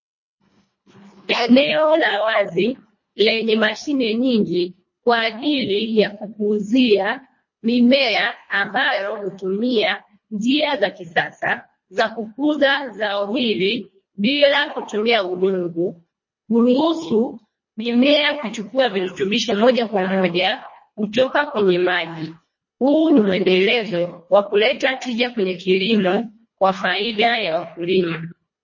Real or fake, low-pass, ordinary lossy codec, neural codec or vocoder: fake; 7.2 kHz; MP3, 32 kbps; codec, 24 kHz, 1.5 kbps, HILCodec